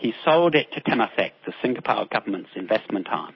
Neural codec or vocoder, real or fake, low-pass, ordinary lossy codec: none; real; 7.2 kHz; MP3, 24 kbps